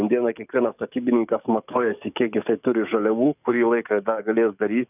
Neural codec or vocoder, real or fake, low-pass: codec, 44.1 kHz, 7.8 kbps, DAC; fake; 3.6 kHz